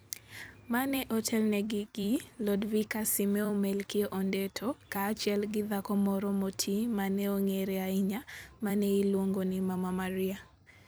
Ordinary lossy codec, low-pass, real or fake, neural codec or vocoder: none; none; fake; vocoder, 44.1 kHz, 128 mel bands every 256 samples, BigVGAN v2